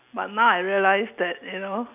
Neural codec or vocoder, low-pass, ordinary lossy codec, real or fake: none; 3.6 kHz; none; real